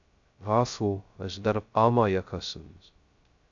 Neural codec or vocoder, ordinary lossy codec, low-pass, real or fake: codec, 16 kHz, 0.2 kbps, FocalCodec; AAC, 64 kbps; 7.2 kHz; fake